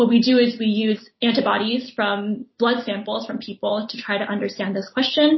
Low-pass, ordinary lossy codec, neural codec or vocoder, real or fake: 7.2 kHz; MP3, 24 kbps; none; real